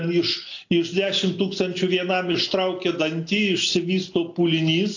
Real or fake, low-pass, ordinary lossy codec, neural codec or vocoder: real; 7.2 kHz; AAC, 48 kbps; none